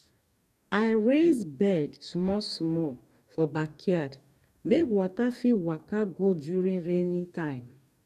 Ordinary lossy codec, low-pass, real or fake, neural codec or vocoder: none; 14.4 kHz; fake; codec, 44.1 kHz, 2.6 kbps, DAC